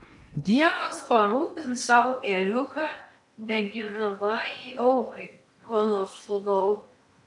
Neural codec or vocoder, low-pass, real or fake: codec, 16 kHz in and 24 kHz out, 0.8 kbps, FocalCodec, streaming, 65536 codes; 10.8 kHz; fake